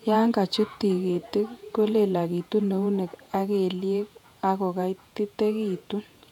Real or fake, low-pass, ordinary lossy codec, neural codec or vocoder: fake; 19.8 kHz; none; vocoder, 48 kHz, 128 mel bands, Vocos